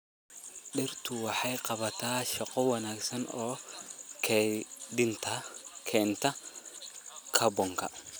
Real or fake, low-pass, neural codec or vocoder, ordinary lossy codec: real; none; none; none